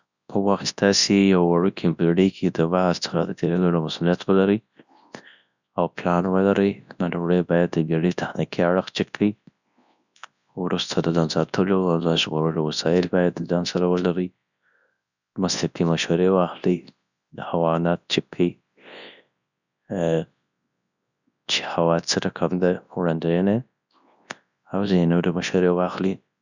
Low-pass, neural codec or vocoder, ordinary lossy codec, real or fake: 7.2 kHz; codec, 24 kHz, 0.9 kbps, WavTokenizer, large speech release; none; fake